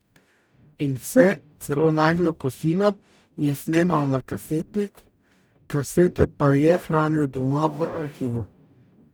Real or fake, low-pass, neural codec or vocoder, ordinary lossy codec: fake; none; codec, 44.1 kHz, 0.9 kbps, DAC; none